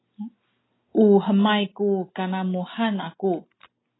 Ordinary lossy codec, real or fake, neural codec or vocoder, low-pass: AAC, 16 kbps; real; none; 7.2 kHz